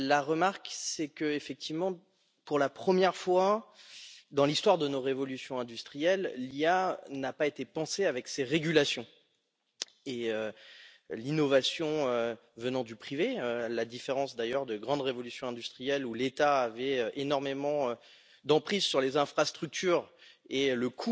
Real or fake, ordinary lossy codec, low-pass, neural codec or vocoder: real; none; none; none